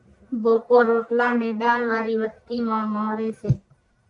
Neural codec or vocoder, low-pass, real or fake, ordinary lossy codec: codec, 44.1 kHz, 1.7 kbps, Pupu-Codec; 10.8 kHz; fake; AAC, 64 kbps